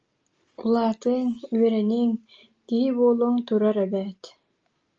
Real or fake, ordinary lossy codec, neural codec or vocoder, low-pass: real; Opus, 24 kbps; none; 7.2 kHz